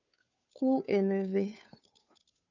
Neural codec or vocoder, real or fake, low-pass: codec, 16 kHz, 2 kbps, FunCodec, trained on Chinese and English, 25 frames a second; fake; 7.2 kHz